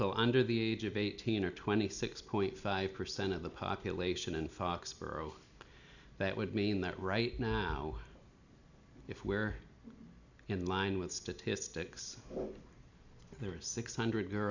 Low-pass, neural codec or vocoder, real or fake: 7.2 kHz; none; real